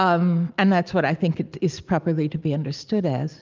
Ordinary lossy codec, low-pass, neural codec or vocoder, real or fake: Opus, 24 kbps; 7.2 kHz; none; real